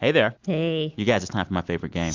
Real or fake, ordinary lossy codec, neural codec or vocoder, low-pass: real; MP3, 64 kbps; none; 7.2 kHz